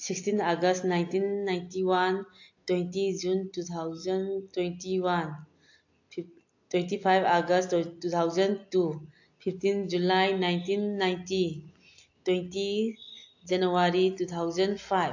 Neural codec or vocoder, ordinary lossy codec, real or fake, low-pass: none; AAC, 48 kbps; real; 7.2 kHz